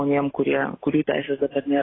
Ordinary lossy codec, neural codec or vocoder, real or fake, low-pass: AAC, 16 kbps; none; real; 7.2 kHz